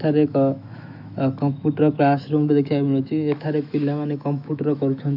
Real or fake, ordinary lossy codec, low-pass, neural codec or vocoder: fake; none; 5.4 kHz; autoencoder, 48 kHz, 128 numbers a frame, DAC-VAE, trained on Japanese speech